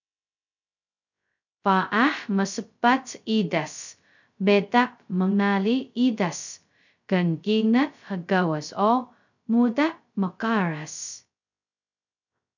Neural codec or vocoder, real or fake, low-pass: codec, 16 kHz, 0.2 kbps, FocalCodec; fake; 7.2 kHz